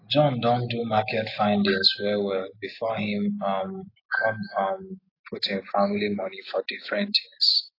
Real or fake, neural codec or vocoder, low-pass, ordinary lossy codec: real; none; 5.4 kHz; AAC, 32 kbps